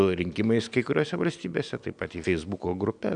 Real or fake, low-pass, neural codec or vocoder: real; 9.9 kHz; none